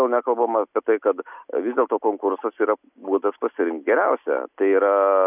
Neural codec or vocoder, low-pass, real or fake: none; 3.6 kHz; real